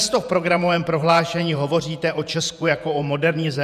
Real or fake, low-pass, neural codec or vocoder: fake; 14.4 kHz; vocoder, 48 kHz, 128 mel bands, Vocos